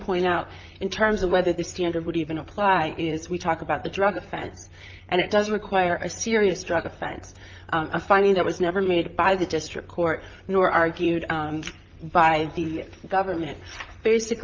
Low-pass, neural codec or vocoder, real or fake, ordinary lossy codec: 7.2 kHz; vocoder, 44.1 kHz, 128 mel bands, Pupu-Vocoder; fake; Opus, 24 kbps